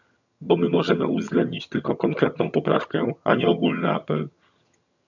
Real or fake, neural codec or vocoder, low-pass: fake; vocoder, 22.05 kHz, 80 mel bands, HiFi-GAN; 7.2 kHz